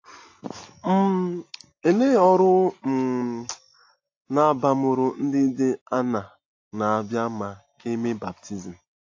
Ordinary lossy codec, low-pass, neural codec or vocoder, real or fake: AAC, 48 kbps; 7.2 kHz; none; real